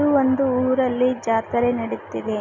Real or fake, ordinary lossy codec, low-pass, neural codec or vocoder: real; none; 7.2 kHz; none